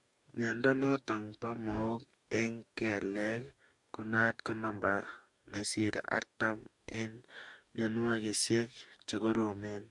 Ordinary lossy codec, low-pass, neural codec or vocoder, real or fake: MP3, 64 kbps; 10.8 kHz; codec, 44.1 kHz, 2.6 kbps, DAC; fake